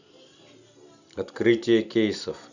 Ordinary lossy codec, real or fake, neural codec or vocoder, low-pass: none; fake; vocoder, 44.1 kHz, 128 mel bands every 256 samples, BigVGAN v2; 7.2 kHz